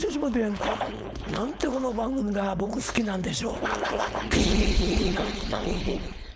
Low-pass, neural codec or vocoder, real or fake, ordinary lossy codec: none; codec, 16 kHz, 4.8 kbps, FACodec; fake; none